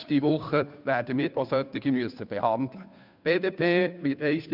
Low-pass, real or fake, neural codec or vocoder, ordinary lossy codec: 5.4 kHz; fake; codec, 16 kHz, 2 kbps, FunCodec, trained on Chinese and English, 25 frames a second; none